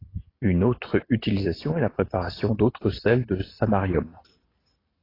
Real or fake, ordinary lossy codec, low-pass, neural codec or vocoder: real; AAC, 24 kbps; 5.4 kHz; none